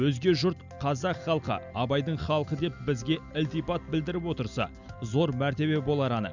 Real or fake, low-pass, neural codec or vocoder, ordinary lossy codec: real; 7.2 kHz; none; none